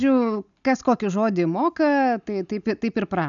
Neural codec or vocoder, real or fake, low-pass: none; real; 7.2 kHz